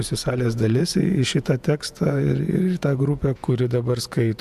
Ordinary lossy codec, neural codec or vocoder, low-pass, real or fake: AAC, 96 kbps; vocoder, 48 kHz, 128 mel bands, Vocos; 14.4 kHz; fake